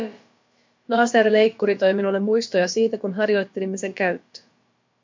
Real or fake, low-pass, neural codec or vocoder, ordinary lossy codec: fake; 7.2 kHz; codec, 16 kHz, about 1 kbps, DyCAST, with the encoder's durations; MP3, 48 kbps